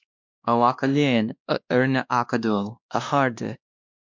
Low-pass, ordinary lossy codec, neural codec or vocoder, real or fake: 7.2 kHz; MP3, 64 kbps; codec, 16 kHz, 1 kbps, X-Codec, WavLM features, trained on Multilingual LibriSpeech; fake